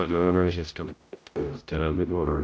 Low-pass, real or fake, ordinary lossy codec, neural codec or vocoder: none; fake; none; codec, 16 kHz, 0.5 kbps, X-Codec, HuBERT features, trained on general audio